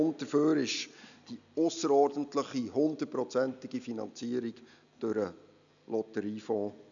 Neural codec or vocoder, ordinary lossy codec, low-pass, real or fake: none; none; 7.2 kHz; real